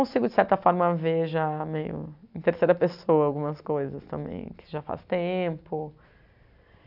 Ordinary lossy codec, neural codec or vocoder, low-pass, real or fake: none; none; 5.4 kHz; real